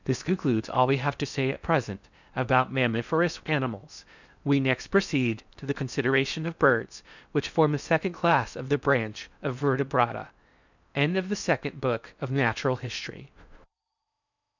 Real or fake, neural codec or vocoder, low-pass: fake; codec, 16 kHz in and 24 kHz out, 0.6 kbps, FocalCodec, streaming, 4096 codes; 7.2 kHz